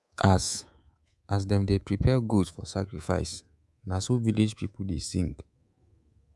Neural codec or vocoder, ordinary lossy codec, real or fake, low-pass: codec, 24 kHz, 3.1 kbps, DualCodec; none; fake; none